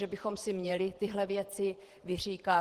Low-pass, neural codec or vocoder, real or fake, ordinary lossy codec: 14.4 kHz; vocoder, 48 kHz, 128 mel bands, Vocos; fake; Opus, 16 kbps